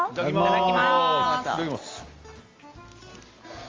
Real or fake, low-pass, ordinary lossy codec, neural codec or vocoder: real; 7.2 kHz; Opus, 32 kbps; none